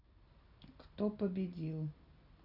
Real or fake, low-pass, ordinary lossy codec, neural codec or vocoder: real; 5.4 kHz; none; none